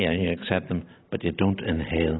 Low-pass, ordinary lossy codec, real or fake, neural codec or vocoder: 7.2 kHz; AAC, 16 kbps; real; none